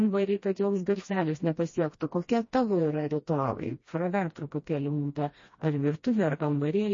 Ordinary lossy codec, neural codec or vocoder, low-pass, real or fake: MP3, 32 kbps; codec, 16 kHz, 1 kbps, FreqCodec, smaller model; 7.2 kHz; fake